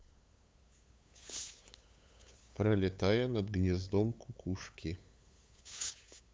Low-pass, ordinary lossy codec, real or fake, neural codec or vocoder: none; none; fake; codec, 16 kHz, 8 kbps, FunCodec, trained on LibriTTS, 25 frames a second